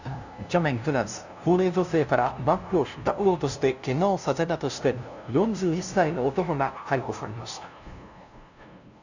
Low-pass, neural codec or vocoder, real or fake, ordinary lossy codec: 7.2 kHz; codec, 16 kHz, 0.5 kbps, FunCodec, trained on LibriTTS, 25 frames a second; fake; none